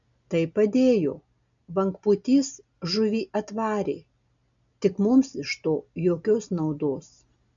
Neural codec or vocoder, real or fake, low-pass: none; real; 7.2 kHz